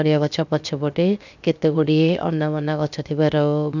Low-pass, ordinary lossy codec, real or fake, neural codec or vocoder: 7.2 kHz; none; fake; codec, 16 kHz, 0.7 kbps, FocalCodec